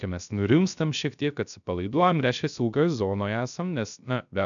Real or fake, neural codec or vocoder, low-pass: fake; codec, 16 kHz, 0.3 kbps, FocalCodec; 7.2 kHz